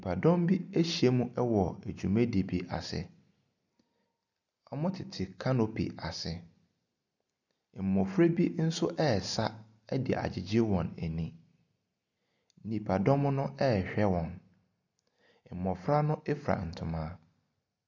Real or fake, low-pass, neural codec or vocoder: real; 7.2 kHz; none